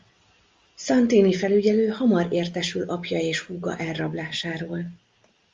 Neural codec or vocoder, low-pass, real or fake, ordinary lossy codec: none; 7.2 kHz; real; Opus, 32 kbps